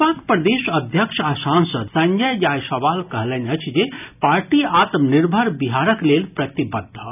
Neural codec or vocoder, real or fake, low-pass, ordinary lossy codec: none; real; 3.6 kHz; none